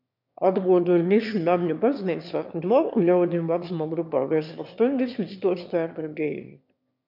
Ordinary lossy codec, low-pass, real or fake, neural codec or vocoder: MP3, 48 kbps; 5.4 kHz; fake; autoencoder, 22.05 kHz, a latent of 192 numbers a frame, VITS, trained on one speaker